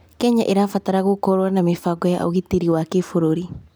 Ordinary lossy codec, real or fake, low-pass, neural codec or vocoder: none; real; none; none